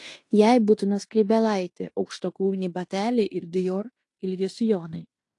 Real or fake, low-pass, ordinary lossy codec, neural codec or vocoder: fake; 10.8 kHz; AAC, 48 kbps; codec, 16 kHz in and 24 kHz out, 0.9 kbps, LongCat-Audio-Codec, fine tuned four codebook decoder